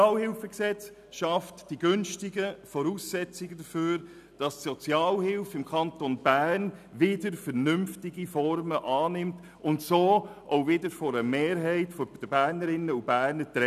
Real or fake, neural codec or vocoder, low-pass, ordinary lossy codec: real; none; 14.4 kHz; none